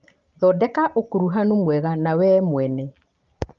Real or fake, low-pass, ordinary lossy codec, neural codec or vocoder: real; 7.2 kHz; Opus, 32 kbps; none